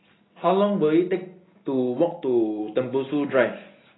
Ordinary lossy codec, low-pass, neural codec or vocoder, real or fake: AAC, 16 kbps; 7.2 kHz; none; real